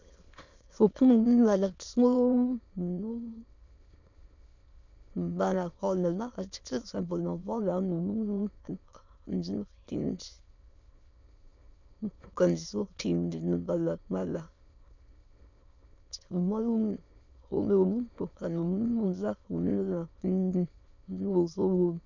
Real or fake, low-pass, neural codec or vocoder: fake; 7.2 kHz; autoencoder, 22.05 kHz, a latent of 192 numbers a frame, VITS, trained on many speakers